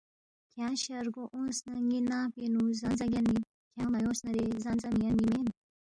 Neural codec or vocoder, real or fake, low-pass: none; real; 9.9 kHz